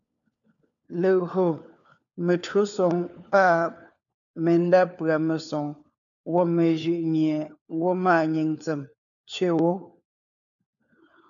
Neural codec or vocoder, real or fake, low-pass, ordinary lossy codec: codec, 16 kHz, 4 kbps, FunCodec, trained on LibriTTS, 50 frames a second; fake; 7.2 kHz; MP3, 96 kbps